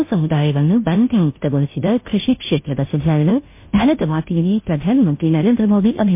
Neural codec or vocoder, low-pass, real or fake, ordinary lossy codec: codec, 16 kHz, 0.5 kbps, FunCodec, trained on Chinese and English, 25 frames a second; 3.6 kHz; fake; MP3, 24 kbps